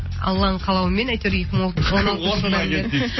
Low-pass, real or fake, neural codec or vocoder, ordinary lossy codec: 7.2 kHz; real; none; MP3, 24 kbps